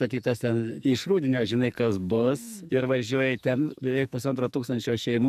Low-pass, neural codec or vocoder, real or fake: 14.4 kHz; codec, 44.1 kHz, 2.6 kbps, SNAC; fake